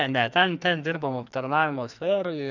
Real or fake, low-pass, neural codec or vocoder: fake; 7.2 kHz; codec, 44.1 kHz, 2.6 kbps, SNAC